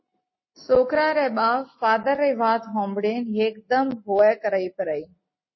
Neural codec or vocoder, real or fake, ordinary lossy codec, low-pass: none; real; MP3, 24 kbps; 7.2 kHz